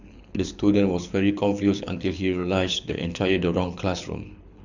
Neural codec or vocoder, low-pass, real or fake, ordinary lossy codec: codec, 24 kHz, 6 kbps, HILCodec; 7.2 kHz; fake; none